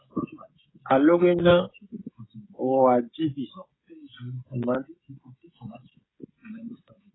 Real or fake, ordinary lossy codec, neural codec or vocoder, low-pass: fake; AAC, 16 kbps; codec, 16 kHz, 16 kbps, FreqCodec, smaller model; 7.2 kHz